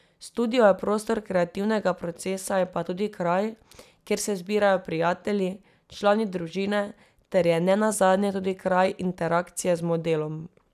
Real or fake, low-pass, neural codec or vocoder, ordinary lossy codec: real; 14.4 kHz; none; none